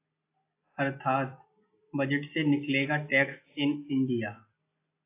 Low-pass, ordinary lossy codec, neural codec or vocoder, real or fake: 3.6 kHz; AAC, 24 kbps; none; real